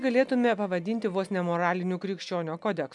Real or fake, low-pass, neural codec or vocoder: real; 10.8 kHz; none